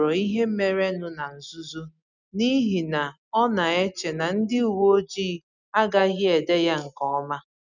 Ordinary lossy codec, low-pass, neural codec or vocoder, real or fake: none; 7.2 kHz; none; real